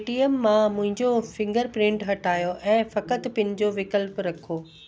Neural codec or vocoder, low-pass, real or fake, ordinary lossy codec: none; none; real; none